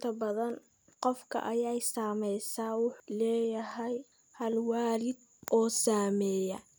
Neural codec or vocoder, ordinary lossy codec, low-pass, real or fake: none; none; none; real